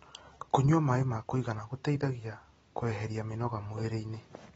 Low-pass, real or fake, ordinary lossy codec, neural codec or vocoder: 19.8 kHz; real; AAC, 24 kbps; none